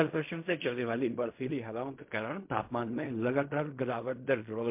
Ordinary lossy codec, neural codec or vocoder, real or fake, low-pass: MP3, 32 kbps; codec, 16 kHz in and 24 kHz out, 0.4 kbps, LongCat-Audio-Codec, fine tuned four codebook decoder; fake; 3.6 kHz